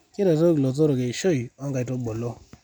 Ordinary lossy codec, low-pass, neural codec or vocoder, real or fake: none; 19.8 kHz; none; real